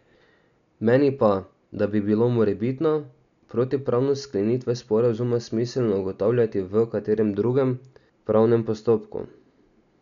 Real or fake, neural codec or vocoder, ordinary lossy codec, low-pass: real; none; none; 7.2 kHz